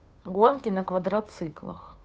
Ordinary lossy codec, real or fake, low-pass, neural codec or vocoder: none; fake; none; codec, 16 kHz, 2 kbps, FunCodec, trained on Chinese and English, 25 frames a second